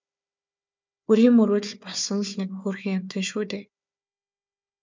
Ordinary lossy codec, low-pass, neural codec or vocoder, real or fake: MP3, 64 kbps; 7.2 kHz; codec, 16 kHz, 4 kbps, FunCodec, trained on Chinese and English, 50 frames a second; fake